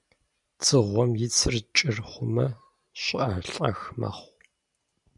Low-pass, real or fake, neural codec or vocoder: 10.8 kHz; fake; vocoder, 44.1 kHz, 128 mel bands every 512 samples, BigVGAN v2